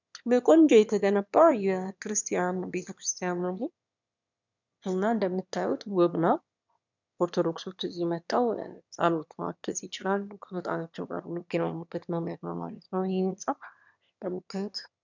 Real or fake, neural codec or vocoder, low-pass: fake; autoencoder, 22.05 kHz, a latent of 192 numbers a frame, VITS, trained on one speaker; 7.2 kHz